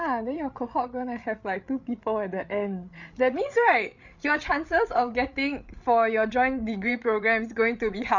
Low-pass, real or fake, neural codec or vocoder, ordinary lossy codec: 7.2 kHz; fake; codec, 16 kHz, 8 kbps, FreqCodec, larger model; none